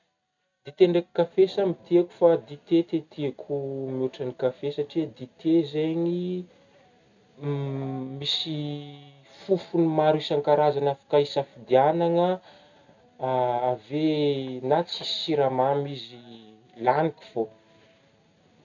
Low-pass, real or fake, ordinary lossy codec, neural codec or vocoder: 7.2 kHz; real; none; none